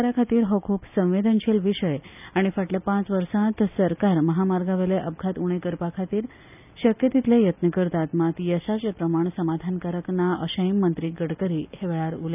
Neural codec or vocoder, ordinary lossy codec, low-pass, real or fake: none; none; 3.6 kHz; real